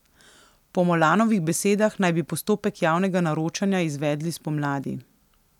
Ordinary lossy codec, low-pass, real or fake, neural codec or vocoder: none; 19.8 kHz; real; none